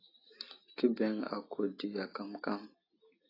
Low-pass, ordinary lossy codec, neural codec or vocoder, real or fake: 5.4 kHz; AAC, 24 kbps; none; real